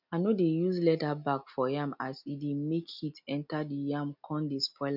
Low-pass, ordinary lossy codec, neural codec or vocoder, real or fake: 5.4 kHz; none; none; real